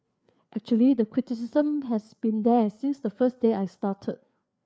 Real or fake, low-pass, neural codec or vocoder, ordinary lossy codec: fake; none; codec, 16 kHz, 4 kbps, FreqCodec, larger model; none